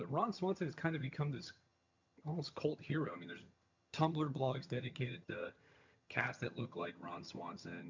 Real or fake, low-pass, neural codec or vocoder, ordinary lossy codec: fake; 7.2 kHz; vocoder, 22.05 kHz, 80 mel bands, HiFi-GAN; MP3, 64 kbps